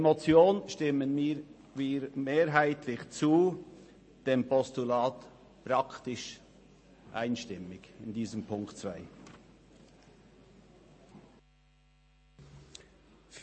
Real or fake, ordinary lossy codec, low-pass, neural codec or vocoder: real; MP3, 32 kbps; 9.9 kHz; none